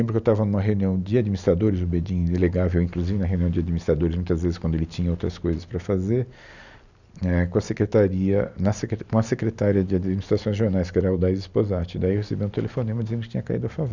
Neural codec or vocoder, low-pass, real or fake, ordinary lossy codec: none; 7.2 kHz; real; none